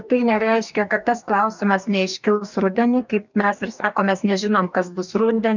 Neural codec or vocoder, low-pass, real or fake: codec, 44.1 kHz, 2.6 kbps, DAC; 7.2 kHz; fake